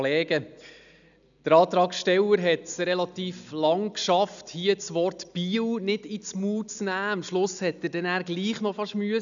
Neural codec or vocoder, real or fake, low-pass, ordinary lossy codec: none; real; 7.2 kHz; none